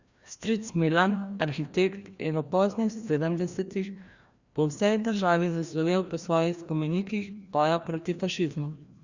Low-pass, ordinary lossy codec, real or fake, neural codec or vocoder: 7.2 kHz; Opus, 64 kbps; fake; codec, 16 kHz, 1 kbps, FreqCodec, larger model